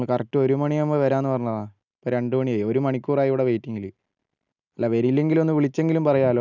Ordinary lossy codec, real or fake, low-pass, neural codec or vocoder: none; real; 7.2 kHz; none